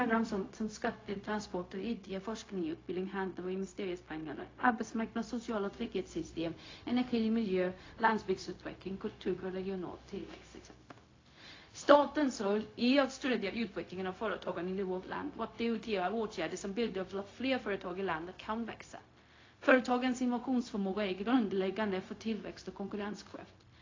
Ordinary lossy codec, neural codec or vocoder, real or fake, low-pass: AAC, 32 kbps; codec, 16 kHz, 0.4 kbps, LongCat-Audio-Codec; fake; 7.2 kHz